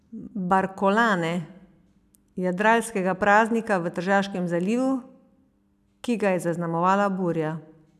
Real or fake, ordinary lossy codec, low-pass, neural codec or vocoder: real; none; 14.4 kHz; none